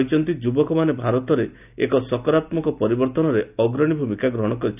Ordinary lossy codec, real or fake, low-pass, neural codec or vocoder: none; real; 3.6 kHz; none